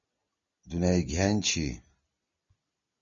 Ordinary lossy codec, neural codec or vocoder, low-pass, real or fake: MP3, 32 kbps; none; 7.2 kHz; real